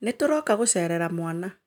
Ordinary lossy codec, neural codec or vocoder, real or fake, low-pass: none; none; real; 19.8 kHz